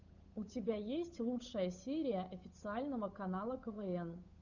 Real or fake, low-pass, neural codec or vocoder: fake; 7.2 kHz; codec, 16 kHz, 8 kbps, FunCodec, trained on Chinese and English, 25 frames a second